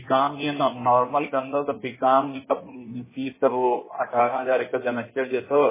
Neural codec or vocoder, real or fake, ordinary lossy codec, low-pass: codec, 16 kHz in and 24 kHz out, 1.1 kbps, FireRedTTS-2 codec; fake; MP3, 16 kbps; 3.6 kHz